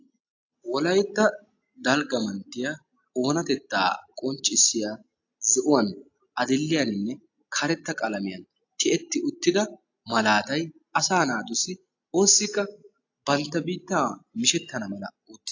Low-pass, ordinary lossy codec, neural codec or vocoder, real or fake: 7.2 kHz; AAC, 48 kbps; none; real